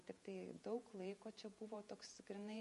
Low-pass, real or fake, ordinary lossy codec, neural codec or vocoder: 14.4 kHz; fake; MP3, 48 kbps; vocoder, 48 kHz, 128 mel bands, Vocos